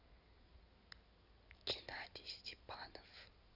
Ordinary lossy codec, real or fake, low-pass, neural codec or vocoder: none; fake; 5.4 kHz; codec, 16 kHz in and 24 kHz out, 2.2 kbps, FireRedTTS-2 codec